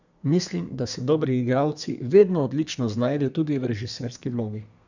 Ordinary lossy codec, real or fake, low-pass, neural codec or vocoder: none; fake; 7.2 kHz; codec, 32 kHz, 1.9 kbps, SNAC